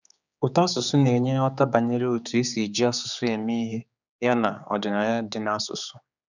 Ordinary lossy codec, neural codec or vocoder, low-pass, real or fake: none; codec, 16 kHz, 4 kbps, X-Codec, HuBERT features, trained on general audio; 7.2 kHz; fake